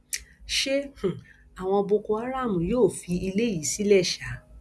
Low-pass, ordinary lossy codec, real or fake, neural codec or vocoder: none; none; real; none